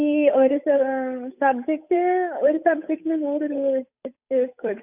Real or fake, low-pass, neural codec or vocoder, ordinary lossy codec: fake; 3.6 kHz; codec, 16 kHz, 8 kbps, FunCodec, trained on Chinese and English, 25 frames a second; none